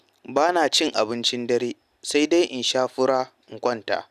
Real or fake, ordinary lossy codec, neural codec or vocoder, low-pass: real; none; none; 14.4 kHz